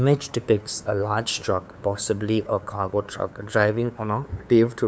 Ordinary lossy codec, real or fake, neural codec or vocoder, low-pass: none; fake; codec, 16 kHz, 2 kbps, FunCodec, trained on LibriTTS, 25 frames a second; none